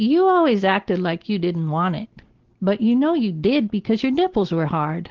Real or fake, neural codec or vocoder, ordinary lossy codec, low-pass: real; none; Opus, 16 kbps; 7.2 kHz